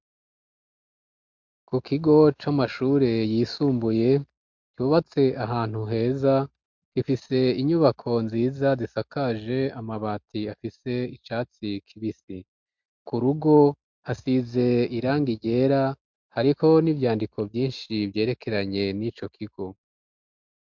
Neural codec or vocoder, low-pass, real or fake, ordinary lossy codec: none; 7.2 kHz; real; MP3, 64 kbps